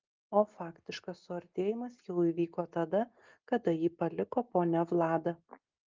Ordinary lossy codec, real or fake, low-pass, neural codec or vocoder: Opus, 24 kbps; real; 7.2 kHz; none